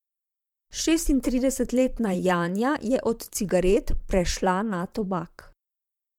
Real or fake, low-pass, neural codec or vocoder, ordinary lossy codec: fake; 19.8 kHz; vocoder, 44.1 kHz, 128 mel bands, Pupu-Vocoder; MP3, 96 kbps